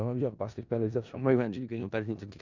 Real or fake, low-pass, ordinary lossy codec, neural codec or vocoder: fake; 7.2 kHz; none; codec, 16 kHz in and 24 kHz out, 0.4 kbps, LongCat-Audio-Codec, four codebook decoder